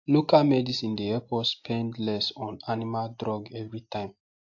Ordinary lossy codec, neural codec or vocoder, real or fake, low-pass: AAC, 48 kbps; none; real; 7.2 kHz